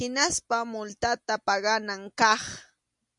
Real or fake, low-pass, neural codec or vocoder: real; 10.8 kHz; none